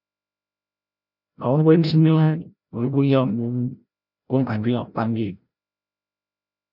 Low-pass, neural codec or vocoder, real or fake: 5.4 kHz; codec, 16 kHz, 0.5 kbps, FreqCodec, larger model; fake